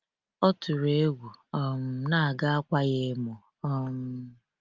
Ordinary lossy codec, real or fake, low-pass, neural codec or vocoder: Opus, 32 kbps; real; 7.2 kHz; none